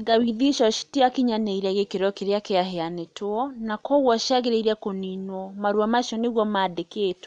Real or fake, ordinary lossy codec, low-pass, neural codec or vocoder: real; none; 9.9 kHz; none